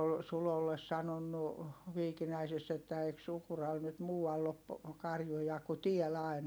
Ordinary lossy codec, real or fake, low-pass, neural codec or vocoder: none; real; none; none